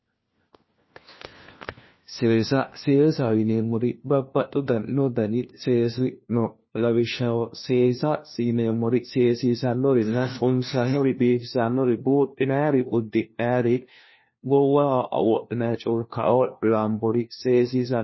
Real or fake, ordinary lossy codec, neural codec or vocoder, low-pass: fake; MP3, 24 kbps; codec, 16 kHz, 1 kbps, FunCodec, trained on LibriTTS, 50 frames a second; 7.2 kHz